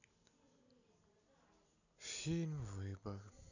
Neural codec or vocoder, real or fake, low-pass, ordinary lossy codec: none; real; 7.2 kHz; none